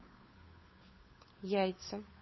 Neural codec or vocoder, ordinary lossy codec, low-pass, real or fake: codec, 16 kHz, 8 kbps, FunCodec, trained on Chinese and English, 25 frames a second; MP3, 24 kbps; 7.2 kHz; fake